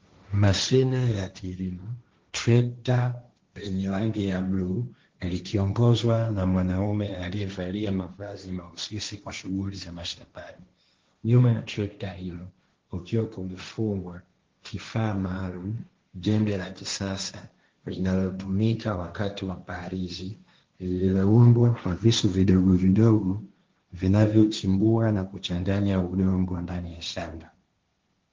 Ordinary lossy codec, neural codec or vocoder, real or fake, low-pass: Opus, 16 kbps; codec, 16 kHz, 1.1 kbps, Voila-Tokenizer; fake; 7.2 kHz